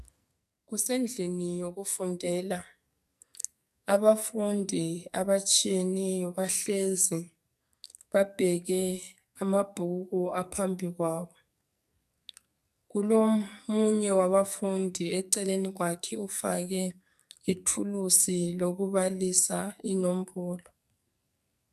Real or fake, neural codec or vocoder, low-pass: fake; codec, 44.1 kHz, 2.6 kbps, SNAC; 14.4 kHz